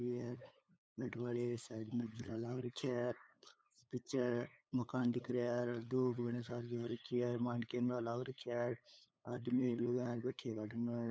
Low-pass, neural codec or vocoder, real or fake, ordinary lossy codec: none; codec, 16 kHz, 8 kbps, FunCodec, trained on LibriTTS, 25 frames a second; fake; none